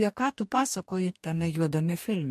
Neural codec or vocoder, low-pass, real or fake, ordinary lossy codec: codec, 44.1 kHz, 2.6 kbps, DAC; 14.4 kHz; fake; MP3, 64 kbps